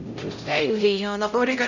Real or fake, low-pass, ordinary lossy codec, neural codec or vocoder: fake; 7.2 kHz; none; codec, 16 kHz, 0.5 kbps, X-Codec, HuBERT features, trained on LibriSpeech